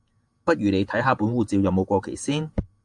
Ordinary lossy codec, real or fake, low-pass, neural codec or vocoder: AAC, 64 kbps; fake; 10.8 kHz; vocoder, 48 kHz, 128 mel bands, Vocos